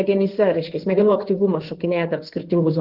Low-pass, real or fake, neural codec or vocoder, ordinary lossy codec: 5.4 kHz; fake; codec, 16 kHz in and 24 kHz out, 2.2 kbps, FireRedTTS-2 codec; Opus, 16 kbps